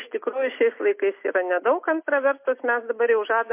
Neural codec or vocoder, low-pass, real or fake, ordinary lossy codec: none; 3.6 kHz; real; MP3, 24 kbps